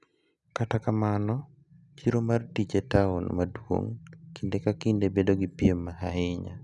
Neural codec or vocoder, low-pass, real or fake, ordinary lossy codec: none; 10.8 kHz; real; none